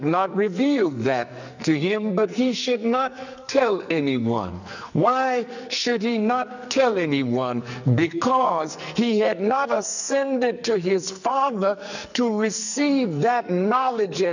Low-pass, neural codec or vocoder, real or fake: 7.2 kHz; codec, 44.1 kHz, 2.6 kbps, SNAC; fake